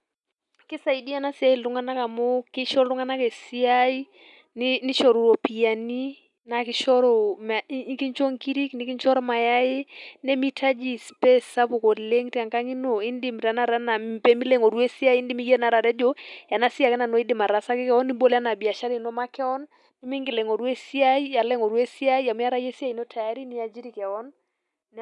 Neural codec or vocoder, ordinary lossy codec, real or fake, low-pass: none; none; real; 10.8 kHz